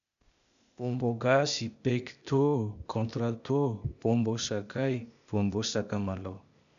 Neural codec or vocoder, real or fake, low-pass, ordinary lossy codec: codec, 16 kHz, 0.8 kbps, ZipCodec; fake; 7.2 kHz; none